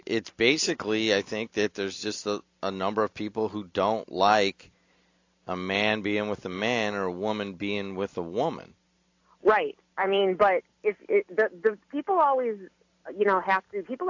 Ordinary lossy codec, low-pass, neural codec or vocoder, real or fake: AAC, 48 kbps; 7.2 kHz; none; real